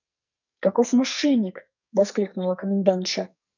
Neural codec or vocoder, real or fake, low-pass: codec, 44.1 kHz, 2.6 kbps, SNAC; fake; 7.2 kHz